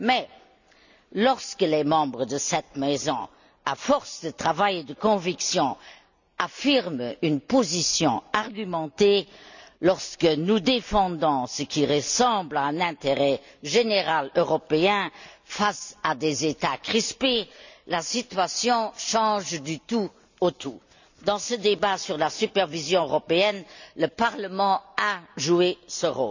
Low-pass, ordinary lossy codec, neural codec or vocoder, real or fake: 7.2 kHz; none; none; real